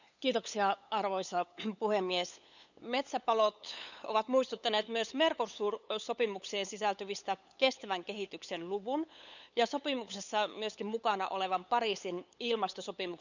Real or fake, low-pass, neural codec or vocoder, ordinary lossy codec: fake; 7.2 kHz; codec, 16 kHz, 8 kbps, FunCodec, trained on LibriTTS, 25 frames a second; none